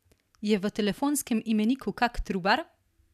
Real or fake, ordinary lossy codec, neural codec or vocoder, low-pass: real; none; none; 14.4 kHz